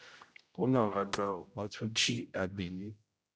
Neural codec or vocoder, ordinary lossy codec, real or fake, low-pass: codec, 16 kHz, 0.5 kbps, X-Codec, HuBERT features, trained on general audio; none; fake; none